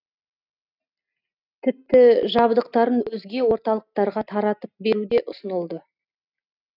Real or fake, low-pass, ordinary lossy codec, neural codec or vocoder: real; 5.4 kHz; none; none